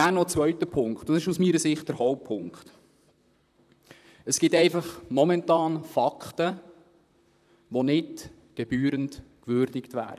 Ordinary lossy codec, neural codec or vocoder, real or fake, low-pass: none; vocoder, 44.1 kHz, 128 mel bands, Pupu-Vocoder; fake; 14.4 kHz